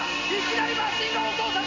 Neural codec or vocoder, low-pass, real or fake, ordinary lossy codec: none; 7.2 kHz; real; none